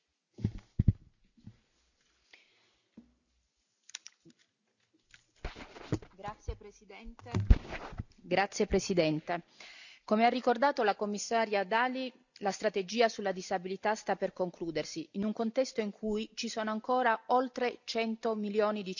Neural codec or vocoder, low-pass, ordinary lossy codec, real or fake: none; 7.2 kHz; none; real